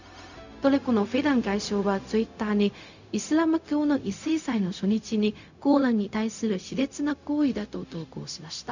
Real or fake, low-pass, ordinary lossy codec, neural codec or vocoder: fake; 7.2 kHz; none; codec, 16 kHz, 0.4 kbps, LongCat-Audio-Codec